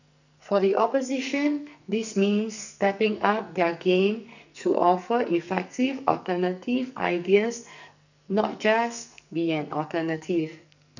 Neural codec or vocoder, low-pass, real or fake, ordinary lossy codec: codec, 44.1 kHz, 2.6 kbps, SNAC; 7.2 kHz; fake; none